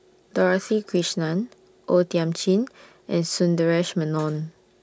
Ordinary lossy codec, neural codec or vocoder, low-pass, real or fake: none; none; none; real